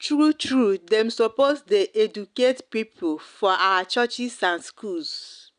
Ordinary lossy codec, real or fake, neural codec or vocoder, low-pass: none; real; none; 9.9 kHz